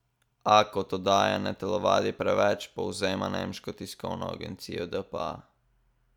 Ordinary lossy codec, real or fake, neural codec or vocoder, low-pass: none; real; none; 19.8 kHz